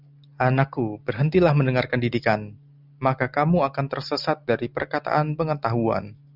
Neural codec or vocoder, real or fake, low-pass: none; real; 5.4 kHz